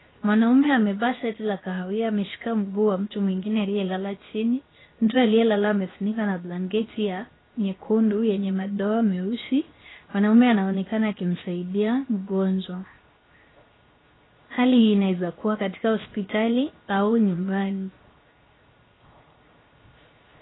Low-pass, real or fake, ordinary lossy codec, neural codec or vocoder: 7.2 kHz; fake; AAC, 16 kbps; codec, 16 kHz, 0.7 kbps, FocalCodec